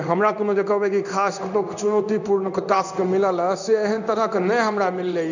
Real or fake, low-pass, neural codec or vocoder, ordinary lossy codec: fake; 7.2 kHz; codec, 16 kHz in and 24 kHz out, 1 kbps, XY-Tokenizer; none